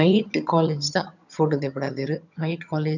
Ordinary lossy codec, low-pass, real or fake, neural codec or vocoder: none; 7.2 kHz; fake; vocoder, 22.05 kHz, 80 mel bands, HiFi-GAN